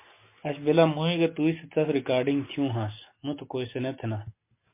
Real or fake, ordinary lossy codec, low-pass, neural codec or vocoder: real; MP3, 24 kbps; 3.6 kHz; none